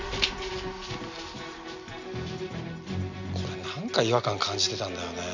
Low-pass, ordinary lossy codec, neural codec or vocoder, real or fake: 7.2 kHz; none; none; real